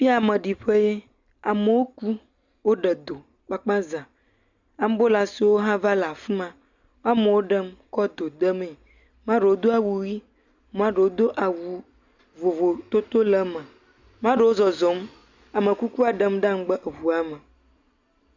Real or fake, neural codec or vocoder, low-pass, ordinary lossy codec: real; none; 7.2 kHz; Opus, 64 kbps